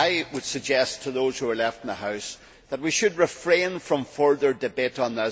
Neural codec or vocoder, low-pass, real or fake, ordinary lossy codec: none; none; real; none